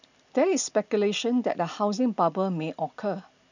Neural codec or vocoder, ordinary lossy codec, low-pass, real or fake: none; none; 7.2 kHz; real